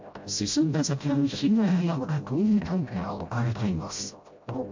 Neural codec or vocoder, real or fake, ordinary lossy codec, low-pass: codec, 16 kHz, 0.5 kbps, FreqCodec, smaller model; fake; MP3, 48 kbps; 7.2 kHz